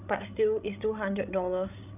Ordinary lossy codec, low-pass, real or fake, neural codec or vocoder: none; 3.6 kHz; fake; codec, 16 kHz, 16 kbps, FreqCodec, larger model